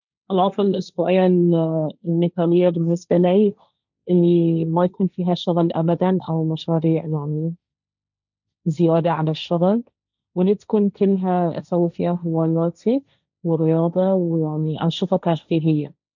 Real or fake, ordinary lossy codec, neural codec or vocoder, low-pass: fake; none; codec, 16 kHz, 1.1 kbps, Voila-Tokenizer; 7.2 kHz